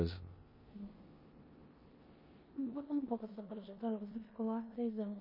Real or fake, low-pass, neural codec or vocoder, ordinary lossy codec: fake; 5.4 kHz; codec, 16 kHz in and 24 kHz out, 0.9 kbps, LongCat-Audio-Codec, four codebook decoder; MP3, 24 kbps